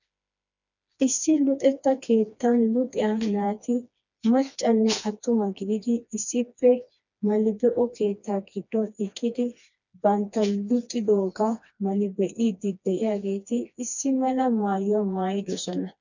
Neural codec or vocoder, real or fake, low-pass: codec, 16 kHz, 2 kbps, FreqCodec, smaller model; fake; 7.2 kHz